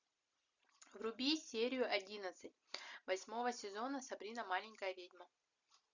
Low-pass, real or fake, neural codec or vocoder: 7.2 kHz; real; none